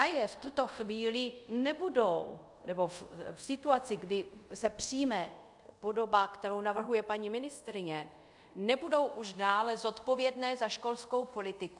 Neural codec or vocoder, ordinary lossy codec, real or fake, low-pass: codec, 24 kHz, 0.5 kbps, DualCodec; MP3, 96 kbps; fake; 10.8 kHz